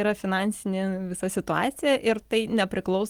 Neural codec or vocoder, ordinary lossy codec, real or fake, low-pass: none; Opus, 24 kbps; real; 19.8 kHz